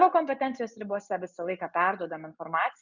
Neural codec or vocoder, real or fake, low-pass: none; real; 7.2 kHz